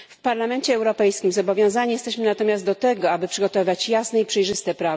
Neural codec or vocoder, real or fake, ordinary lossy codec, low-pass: none; real; none; none